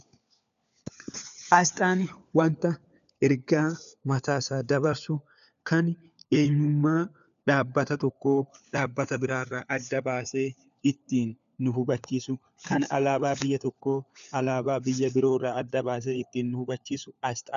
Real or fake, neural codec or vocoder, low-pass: fake; codec, 16 kHz, 4 kbps, FunCodec, trained on LibriTTS, 50 frames a second; 7.2 kHz